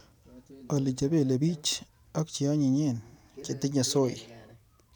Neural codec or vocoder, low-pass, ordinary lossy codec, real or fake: vocoder, 44.1 kHz, 128 mel bands every 512 samples, BigVGAN v2; none; none; fake